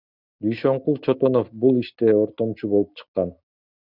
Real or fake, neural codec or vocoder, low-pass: real; none; 5.4 kHz